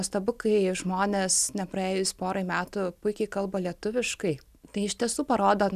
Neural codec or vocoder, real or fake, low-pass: none; real; 14.4 kHz